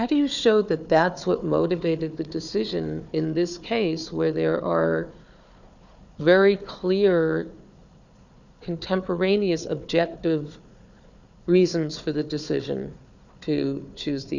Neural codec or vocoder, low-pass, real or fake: codec, 16 kHz, 4 kbps, FunCodec, trained on Chinese and English, 50 frames a second; 7.2 kHz; fake